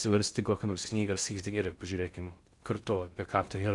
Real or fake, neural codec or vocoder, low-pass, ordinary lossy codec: fake; codec, 16 kHz in and 24 kHz out, 0.6 kbps, FocalCodec, streaming, 2048 codes; 10.8 kHz; Opus, 64 kbps